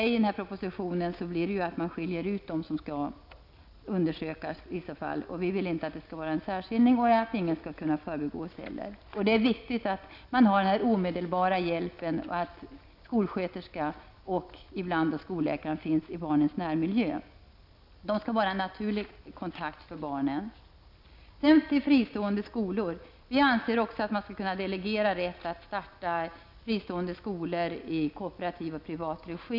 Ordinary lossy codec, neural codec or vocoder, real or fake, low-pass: none; none; real; 5.4 kHz